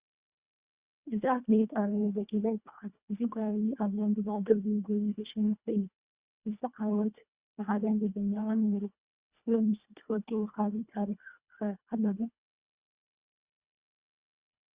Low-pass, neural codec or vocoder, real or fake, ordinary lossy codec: 3.6 kHz; codec, 24 kHz, 1.5 kbps, HILCodec; fake; Opus, 64 kbps